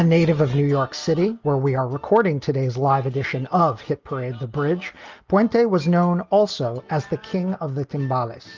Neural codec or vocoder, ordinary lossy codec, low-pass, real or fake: none; Opus, 32 kbps; 7.2 kHz; real